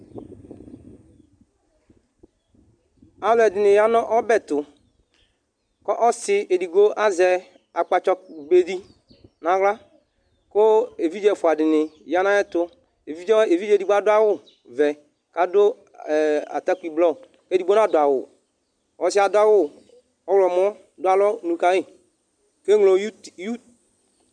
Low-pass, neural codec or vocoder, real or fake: 9.9 kHz; none; real